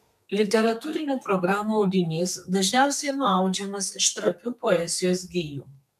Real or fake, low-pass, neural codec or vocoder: fake; 14.4 kHz; codec, 32 kHz, 1.9 kbps, SNAC